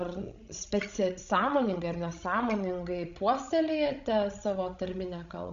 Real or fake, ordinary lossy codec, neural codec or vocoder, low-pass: fake; Opus, 64 kbps; codec, 16 kHz, 16 kbps, FreqCodec, larger model; 7.2 kHz